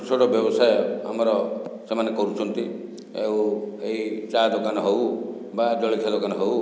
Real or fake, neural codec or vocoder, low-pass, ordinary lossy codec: real; none; none; none